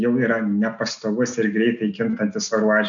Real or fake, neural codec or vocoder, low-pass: real; none; 7.2 kHz